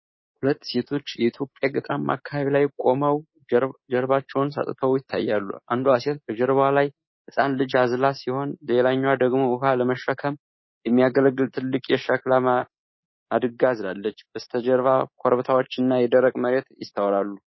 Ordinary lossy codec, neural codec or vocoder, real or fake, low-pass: MP3, 24 kbps; codec, 24 kHz, 3.1 kbps, DualCodec; fake; 7.2 kHz